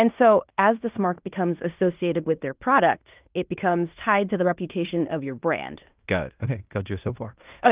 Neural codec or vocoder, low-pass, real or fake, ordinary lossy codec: codec, 16 kHz in and 24 kHz out, 0.9 kbps, LongCat-Audio-Codec, fine tuned four codebook decoder; 3.6 kHz; fake; Opus, 32 kbps